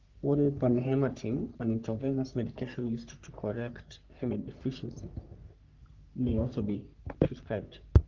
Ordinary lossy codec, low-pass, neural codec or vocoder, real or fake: Opus, 32 kbps; 7.2 kHz; codec, 44.1 kHz, 3.4 kbps, Pupu-Codec; fake